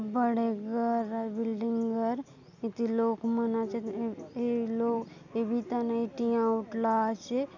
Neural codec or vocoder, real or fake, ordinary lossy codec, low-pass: none; real; none; 7.2 kHz